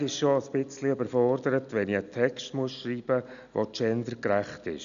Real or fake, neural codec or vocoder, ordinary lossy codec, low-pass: real; none; none; 7.2 kHz